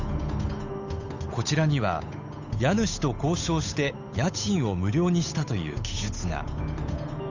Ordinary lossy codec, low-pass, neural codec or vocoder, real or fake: none; 7.2 kHz; codec, 16 kHz, 8 kbps, FunCodec, trained on Chinese and English, 25 frames a second; fake